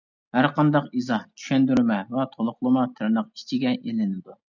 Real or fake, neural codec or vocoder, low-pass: real; none; 7.2 kHz